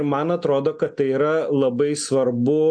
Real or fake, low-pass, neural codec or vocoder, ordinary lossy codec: real; 9.9 kHz; none; AAC, 64 kbps